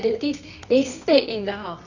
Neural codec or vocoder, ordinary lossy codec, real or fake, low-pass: codec, 24 kHz, 0.9 kbps, WavTokenizer, medium music audio release; none; fake; 7.2 kHz